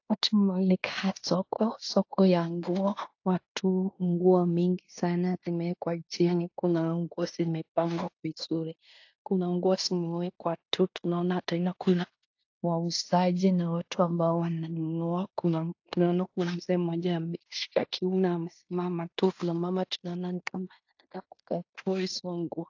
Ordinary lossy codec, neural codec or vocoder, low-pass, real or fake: AAC, 48 kbps; codec, 16 kHz in and 24 kHz out, 0.9 kbps, LongCat-Audio-Codec, fine tuned four codebook decoder; 7.2 kHz; fake